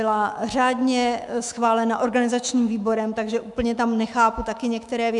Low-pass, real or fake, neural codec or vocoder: 10.8 kHz; fake; autoencoder, 48 kHz, 128 numbers a frame, DAC-VAE, trained on Japanese speech